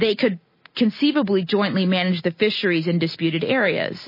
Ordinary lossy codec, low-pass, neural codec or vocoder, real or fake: MP3, 24 kbps; 5.4 kHz; none; real